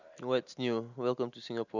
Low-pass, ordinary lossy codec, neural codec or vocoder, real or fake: 7.2 kHz; none; none; real